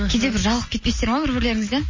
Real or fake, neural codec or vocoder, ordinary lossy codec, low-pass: real; none; MP3, 32 kbps; 7.2 kHz